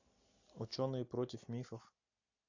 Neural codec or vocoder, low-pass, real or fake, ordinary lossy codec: none; 7.2 kHz; real; AAC, 48 kbps